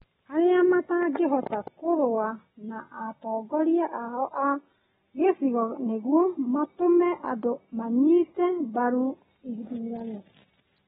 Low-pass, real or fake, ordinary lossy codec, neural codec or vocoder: 19.8 kHz; real; AAC, 16 kbps; none